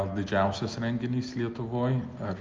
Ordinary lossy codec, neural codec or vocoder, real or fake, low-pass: Opus, 32 kbps; none; real; 7.2 kHz